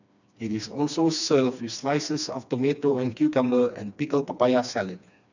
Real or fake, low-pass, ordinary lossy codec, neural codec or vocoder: fake; 7.2 kHz; none; codec, 16 kHz, 2 kbps, FreqCodec, smaller model